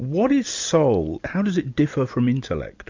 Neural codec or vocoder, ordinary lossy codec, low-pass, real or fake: none; MP3, 64 kbps; 7.2 kHz; real